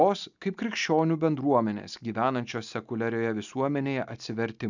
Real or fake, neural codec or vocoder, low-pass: real; none; 7.2 kHz